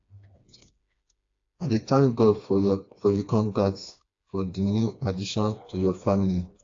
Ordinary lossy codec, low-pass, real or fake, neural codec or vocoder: AAC, 48 kbps; 7.2 kHz; fake; codec, 16 kHz, 2 kbps, FreqCodec, smaller model